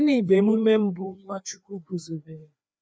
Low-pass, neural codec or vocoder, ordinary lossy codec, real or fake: none; codec, 16 kHz, 2 kbps, FreqCodec, larger model; none; fake